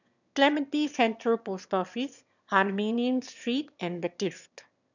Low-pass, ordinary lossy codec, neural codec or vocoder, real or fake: 7.2 kHz; none; autoencoder, 22.05 kHz, a latent of 192 numbers a frame, VITS, trained on one speaker; fake